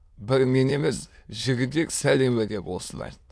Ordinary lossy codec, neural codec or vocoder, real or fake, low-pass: none; autoencoder, 22.05 kHz, a latent of 192 numbers a frame, VITS, trained on many speakers; fake; none